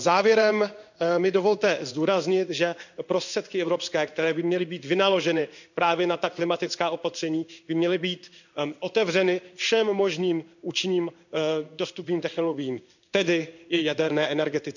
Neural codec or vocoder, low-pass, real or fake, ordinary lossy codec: codec, 16 kHz in and 24 kHz out, 1 kbps, XY-Tokenizer; 7.2 kHz; fake; none